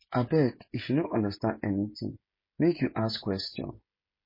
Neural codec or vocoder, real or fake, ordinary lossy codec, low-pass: codec, 16 kHz, 16 kbps, FreqCodec, smaller model; fake; MP3, 24 kbps; 5.4 kHz